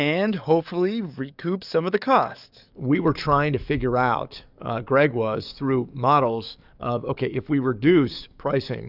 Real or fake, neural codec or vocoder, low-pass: fake; codec, 44.1 kHz, 7.8 kbps, DAC; 5.4 kHz